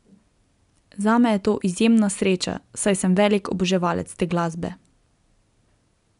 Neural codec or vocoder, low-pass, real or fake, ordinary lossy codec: none; 10.8 kHz; real; none